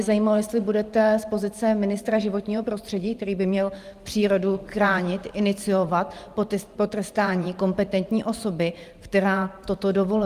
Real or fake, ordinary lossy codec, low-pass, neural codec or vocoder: fake; Opus, 32 kbps; 14.4 kHz; vocoder, 44.1 kHz, 128 mel bands every 512 samples, BigVGAN v2